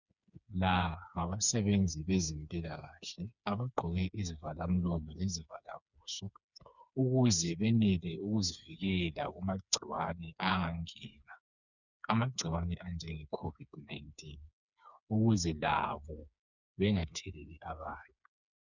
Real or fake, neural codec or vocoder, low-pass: fake; codec, 16 kHz, 4 kbps, FreqCodec, smaller model; 7.2 kHz